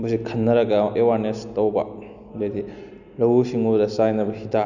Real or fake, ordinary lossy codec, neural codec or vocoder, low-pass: real; none; none; 7.2 kHz